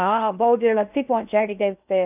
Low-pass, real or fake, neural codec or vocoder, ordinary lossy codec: 3.6 kHz; fake; codec, 16 kHz in and 24 kHz out, 0.6 kbps, FocalCodec, streaming, 2048 codes; none